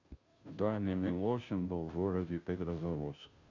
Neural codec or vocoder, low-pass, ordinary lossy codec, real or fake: codec, 16 kHz, 0.5 kbps, FunCodec, trained on Chinese and English, 25 frames a second; 7.2 kHz; Opus, 64 kbps; fake